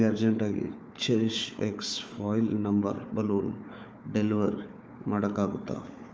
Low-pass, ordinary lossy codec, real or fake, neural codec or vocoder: none; none; fake; codec, 16 kHz, 4 kbps, FunCodec, trained on Chinese and English, 50 frames a second